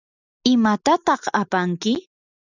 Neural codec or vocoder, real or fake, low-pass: none; real; 7.2 kHz